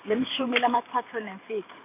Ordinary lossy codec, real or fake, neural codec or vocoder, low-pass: AAC, 24 kbps; fake; vocoder, 44.1 kHz, 128 mel bands every 512 samples, BigVGAN v2; 3.6 kHz